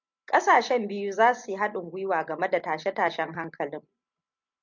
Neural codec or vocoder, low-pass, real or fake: none; 7.2 kHz; real